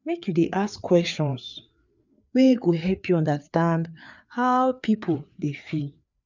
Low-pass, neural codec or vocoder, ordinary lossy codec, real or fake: 7.2 kHz; codec, 16 kHz, 4 kbps, FreqCodec, larger model; none; fake